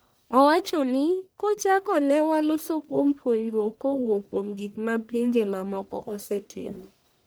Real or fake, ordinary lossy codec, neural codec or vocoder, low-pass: fake; none; codec, 44.1 kHz, 1.7 kbps, Pupu-Codec; none